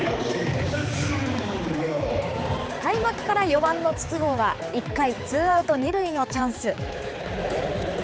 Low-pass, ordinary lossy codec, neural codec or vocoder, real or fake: none; none; codec, 16 kHz, 4 kbps, X-Codec, HuBERT features, trained on balanced general audio; fake